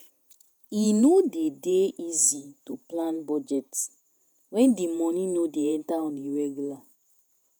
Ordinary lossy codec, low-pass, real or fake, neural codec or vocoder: none; none; fake; vocoder, 48 kHz, 128 mel bands, Vocos